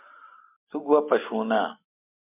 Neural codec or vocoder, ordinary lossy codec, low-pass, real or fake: none; MP3, 32 kbps; 3.6 kHz; real